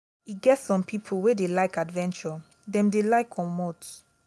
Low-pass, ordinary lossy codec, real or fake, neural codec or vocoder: none; none; real; none